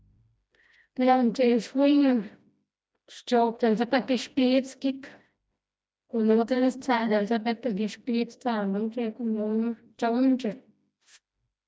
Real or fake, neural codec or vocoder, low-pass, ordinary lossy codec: fake; codec, 16 kHz, 1 kbps, FreqCodec, smaller model; none; none